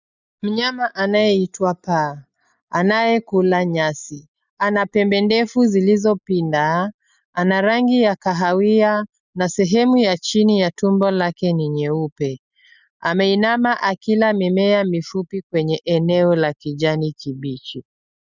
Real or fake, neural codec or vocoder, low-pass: real; none; 7.2 kHz